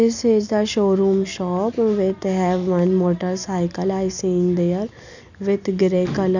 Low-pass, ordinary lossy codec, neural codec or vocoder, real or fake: 7.2 kHz; none; none; real